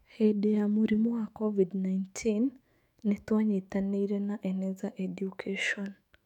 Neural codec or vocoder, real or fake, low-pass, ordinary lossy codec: autoencoder, 48 kHz, 128 numbers a frame, DAC-VAE, trained on Japanese speech; fake; 19.8 kHz; none